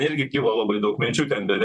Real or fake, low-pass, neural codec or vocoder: fake; 10.8 kHz; vocoder, 44.1 kHz, 128 mel bands, Pupu-Vocoder